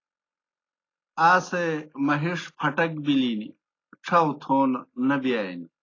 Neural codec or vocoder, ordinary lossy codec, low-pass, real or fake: none; AAC, 32 kbps; 7.2 kHz; real